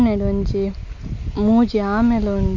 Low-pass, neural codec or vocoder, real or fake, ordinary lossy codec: 7.2 kHz; none; real; none